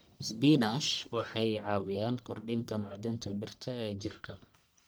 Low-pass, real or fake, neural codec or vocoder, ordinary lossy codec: none; fake; codec, 44.1 kHz, 1.7 kbps, Pupu-Codec; none